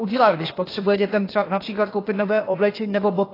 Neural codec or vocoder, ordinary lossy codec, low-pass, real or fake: codec, 16 kHz, 0.8 kbps, ZipCodec; AAC, 24 kbps; 5.4 kHz; fake